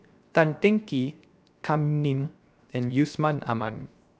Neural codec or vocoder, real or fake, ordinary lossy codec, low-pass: codec, 16 kHz, 0.7 kbps, FocalCodec; fake; none; none